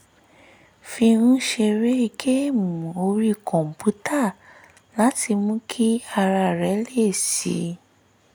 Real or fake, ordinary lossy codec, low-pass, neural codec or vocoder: real; none; none; none